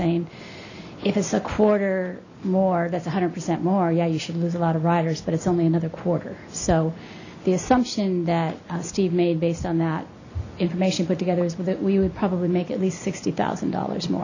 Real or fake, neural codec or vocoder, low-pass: real; none; 7.2 kHz